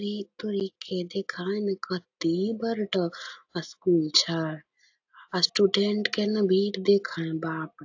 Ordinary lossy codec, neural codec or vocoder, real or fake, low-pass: AAC, 48 kbps; none; real; 7.2 kHz